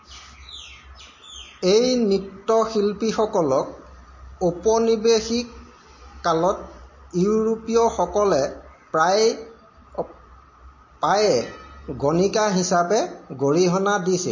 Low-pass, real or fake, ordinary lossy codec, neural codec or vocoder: 7.2 kHz; real; MP3, 32 kbps; none